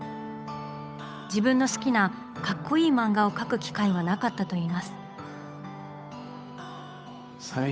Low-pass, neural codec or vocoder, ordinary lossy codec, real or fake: none; codec, 16 kHz, 8 kbps, FunCodec, trained on Chinese and English, 25 frames a second; none; fake